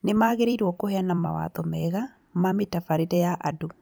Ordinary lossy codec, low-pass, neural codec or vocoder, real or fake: none; none; vocoder, 44.1 kHz, 128 mel bands every 256 samples, BigVGAN v2; fake